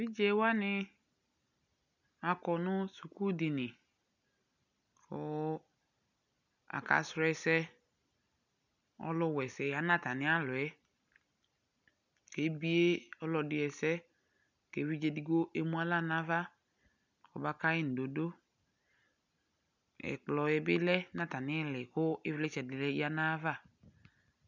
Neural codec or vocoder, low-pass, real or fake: none; 7.2 kHz; real